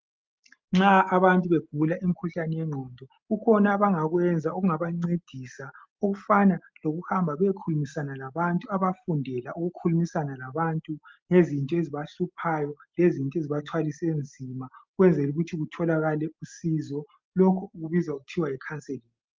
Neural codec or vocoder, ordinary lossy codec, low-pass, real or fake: none; Opus, 32 kbps; 7.2 kHz; real